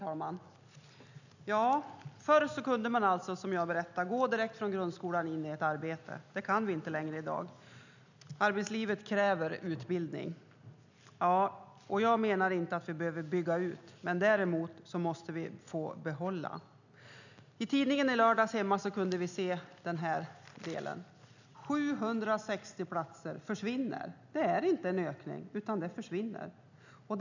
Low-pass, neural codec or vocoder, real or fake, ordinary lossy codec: 7.2 kHz; none; real; none